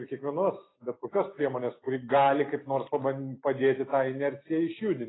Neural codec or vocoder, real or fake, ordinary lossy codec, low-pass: none; real; AAC, 16 kbps; 7.2 kHz